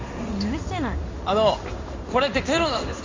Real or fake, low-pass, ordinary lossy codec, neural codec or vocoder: fake; 7.2 kHz; MP3, 48 kbps; codec, 16 kHz in and 24 kHz out, 1 kbps, XY-Tokenizer